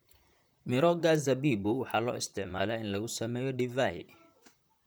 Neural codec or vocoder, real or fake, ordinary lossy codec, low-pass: vocoder, 44.1 kHz, 128 mel bands, Pupu-Vocoder; fake; none; none